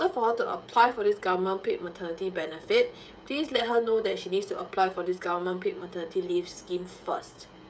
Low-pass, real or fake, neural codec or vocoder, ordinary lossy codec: none; fake; codec, 16 kHz, 16 kbps, FreqCodec, smaller model; none